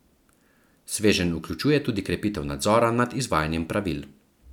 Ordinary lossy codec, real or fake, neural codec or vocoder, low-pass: none; real; none; 19.8 kHz